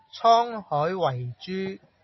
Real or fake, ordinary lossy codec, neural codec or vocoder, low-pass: real; MP3, 24 kbps; none; 7.2 kHz